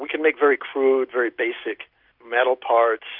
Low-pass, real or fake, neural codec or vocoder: 5.4 kHz; real; none